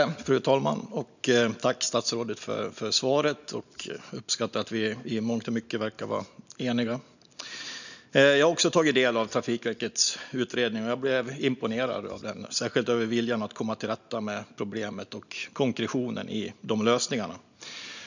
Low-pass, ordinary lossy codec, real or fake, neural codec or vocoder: 7.2 kHz; none; real; none